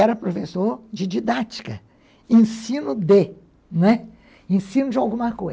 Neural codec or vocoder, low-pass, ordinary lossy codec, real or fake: none; none; none; real